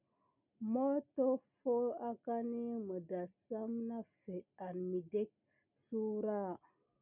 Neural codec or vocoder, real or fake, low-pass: none; real; 3.6 kHz